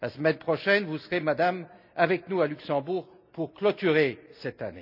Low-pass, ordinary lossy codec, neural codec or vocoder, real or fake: 5.4 kHz; none; none; real